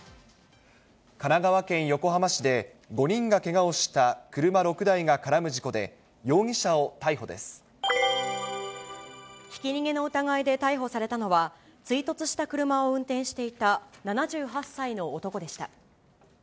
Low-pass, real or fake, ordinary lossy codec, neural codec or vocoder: none; real; none; none